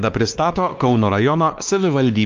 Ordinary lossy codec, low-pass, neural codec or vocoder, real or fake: Opus, 24 kbps; 7.2 kHz; codec, 16 kHz, 2 kbps, X-Codec, WavLM features, trained on Multilingual LibriSpeech; fake